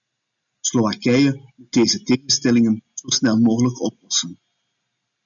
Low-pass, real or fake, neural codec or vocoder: 7.2 kHz; real; none